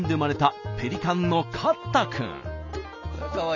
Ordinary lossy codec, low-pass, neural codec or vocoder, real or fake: none; 7.2 kHz; none; real